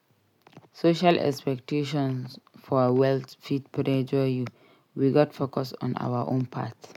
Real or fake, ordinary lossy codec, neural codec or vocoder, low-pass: real; MP3, 96 kbps; none; 19.8 kHz